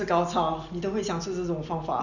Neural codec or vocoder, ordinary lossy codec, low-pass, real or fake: none; none; 7.2 kHz; real